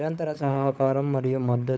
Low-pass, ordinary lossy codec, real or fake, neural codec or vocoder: none; none; fake; codec, 16 kHz, 16 kbps, FunCodec, trained on LibriTTS, 50 frames a second